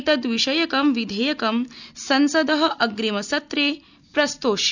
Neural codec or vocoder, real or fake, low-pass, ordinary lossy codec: none; real; 7.2 kHz; none